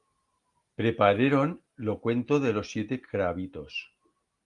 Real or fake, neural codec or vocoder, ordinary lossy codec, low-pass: real; none; Opus, 32 kbps; 10.8 kHz